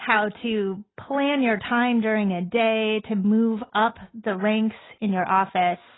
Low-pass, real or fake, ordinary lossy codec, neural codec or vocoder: 7.2 kHz; real; AAC, 16 kbps; none